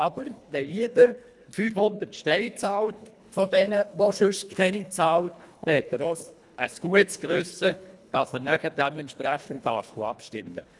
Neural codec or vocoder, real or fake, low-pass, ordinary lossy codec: codec, 24 kHz, 1.5 kbps, HILCodec; fake; none; none